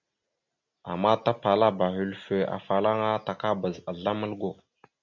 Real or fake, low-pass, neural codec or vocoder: real; 7.2 kHz; none